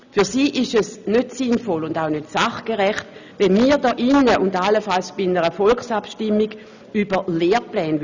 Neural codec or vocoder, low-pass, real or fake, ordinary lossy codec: none; 7.2 kHz; real; none